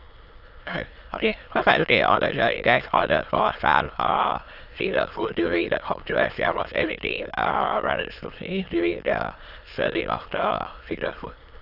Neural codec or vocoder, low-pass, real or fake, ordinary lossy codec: autoencoder, 22.05 kHz, a latent of 192 numbers a frame, VITS, trained on many speakers; 5.4 kHz; fake; Opus, 64 kbps